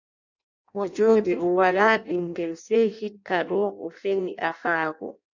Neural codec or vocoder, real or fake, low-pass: codec, 16 kHz in and 24 kHz out, 0.6 kbps, FireRedTTS-2 codec; fake; 7.2 kHz